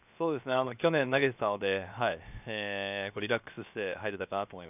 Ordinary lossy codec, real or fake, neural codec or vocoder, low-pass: none; fake; codec, 16 kHz, 0.7 kbps, FocalCodec; 3.6 kHz